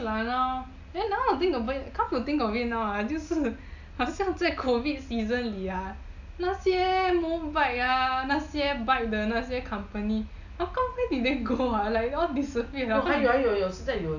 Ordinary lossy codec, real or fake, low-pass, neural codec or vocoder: none; real; 7.2 kHz; none